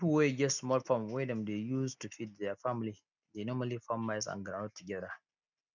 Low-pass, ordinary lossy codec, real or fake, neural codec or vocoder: 7.2 kHz; none; real; none